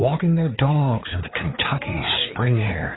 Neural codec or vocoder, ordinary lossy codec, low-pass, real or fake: codec, 16 kHz, 4 kbps, FreqCodec, larger model; AAC, 16 kbps; 7.2 kHz; fake